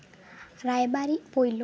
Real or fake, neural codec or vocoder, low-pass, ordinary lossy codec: real; none; none; none